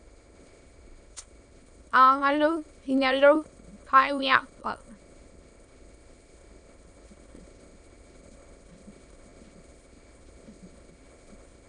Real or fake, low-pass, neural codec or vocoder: fake; 9.9 kHz; autoencoder, 22.05 kHz, a latent of 192 numbers a frame, VITS, trained on many speakers